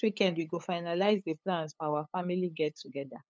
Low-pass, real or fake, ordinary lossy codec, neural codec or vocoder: none; fake; none; codec, 16 kHz, 8 kbps, FunCodec, trained on LibriTTS, 25 frames a second